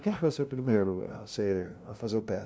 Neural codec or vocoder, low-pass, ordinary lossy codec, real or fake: codec, 16 kHz, 0.5 kbps, FunCodec, trained on LibriTTS, 25 frames a second; none; none; fake